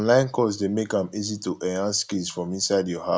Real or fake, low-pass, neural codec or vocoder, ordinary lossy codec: real; none; none; none